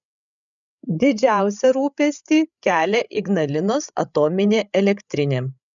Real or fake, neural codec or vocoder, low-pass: fake; codec, 16 kHz, 8 kbps, FreqCodec, larger model; 7.2 kHz